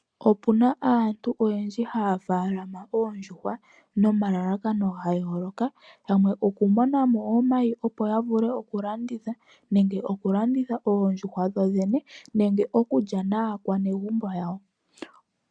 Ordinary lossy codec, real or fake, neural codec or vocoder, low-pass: MP3, 96 kbps; real; none; 9.9 kHz